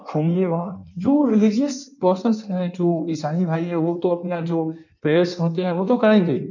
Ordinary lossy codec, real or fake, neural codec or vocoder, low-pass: none; fake; codec, 16 kHz in and 24 kHz out, 1.1 kbps, FireRedTTS-2 codec; 7.2 kHz